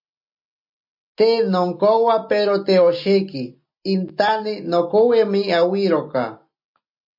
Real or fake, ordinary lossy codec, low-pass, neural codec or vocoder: real; MP3, 32 kbps; 5.4 kHz; none